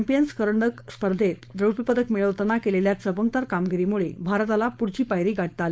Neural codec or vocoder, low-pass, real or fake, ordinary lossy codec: codec, 16 kHz, 4.8 kbps, FACodec; none; fake; none